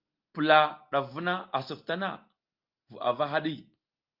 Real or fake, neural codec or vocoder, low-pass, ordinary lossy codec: real; none; 5.4 kHz; Opus, 24 kbps